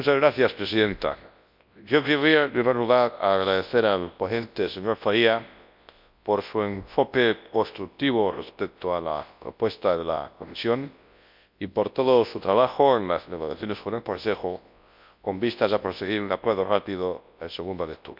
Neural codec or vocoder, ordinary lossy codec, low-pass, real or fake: codec, 24 kHz, 0.9 kbps, WavTokenizer, large speech release; MP3, 48 kbps; 5.4 kHz; fake